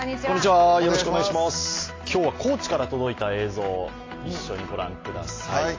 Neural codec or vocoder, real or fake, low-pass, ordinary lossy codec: none; real; 7.2 kHz; AAC, 32 kbps